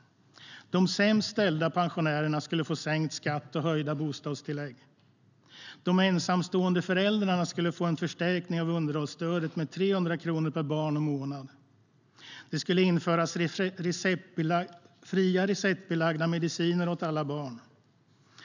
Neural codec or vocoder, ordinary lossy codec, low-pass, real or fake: none; none; 7.2 kHz; real